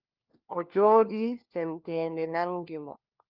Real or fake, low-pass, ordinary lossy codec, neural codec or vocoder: fake; 5.4 kHz; Opus, 24 kbps; codec, 16 kHz, 1 kbps, FunCodec, trained on LibriTTS, 50 frames a second